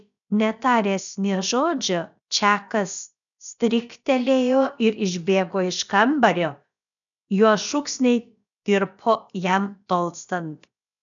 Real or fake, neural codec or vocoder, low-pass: fake; codec, 16 kHz, about 1 kbps, DyCAST, with the encoder's durations; 7.2 kHz